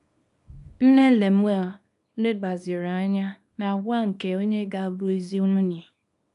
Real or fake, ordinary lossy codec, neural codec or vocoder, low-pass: fake; none; codec, 24 kHz, 0.9 kbps, WavTokenizer, small release; 10.8 kHz